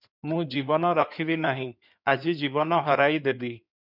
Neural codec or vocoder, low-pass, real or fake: codec, 16 kHz in and 24 kHz out, 2.2 kbps, FireRedTTS-2 codec; 5.4 kHz; fake